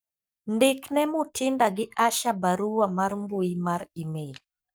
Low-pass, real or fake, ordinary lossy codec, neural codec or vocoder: none; fake; none; codec, 44.1 kHz, 7.8 kbps, Pupu-Codec